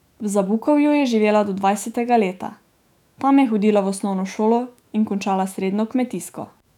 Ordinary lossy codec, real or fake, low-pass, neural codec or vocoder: none; fake; 19.8 kHz; autoencoder, 48 kHz, 128 numbers a frame, DAC-VAE, trained on Japanese speech